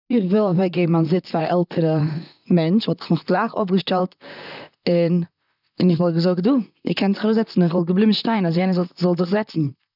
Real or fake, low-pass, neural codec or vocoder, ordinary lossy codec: fake; 5.4 kHz; vocoder, 44.1 kHz, 128 mel bands, Pupu-Vocoder; none